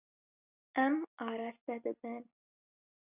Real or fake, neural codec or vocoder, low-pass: real; none; 3.6 kHz